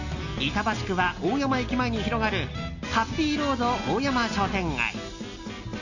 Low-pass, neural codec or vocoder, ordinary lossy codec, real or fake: 7.2 kHz; none; none; real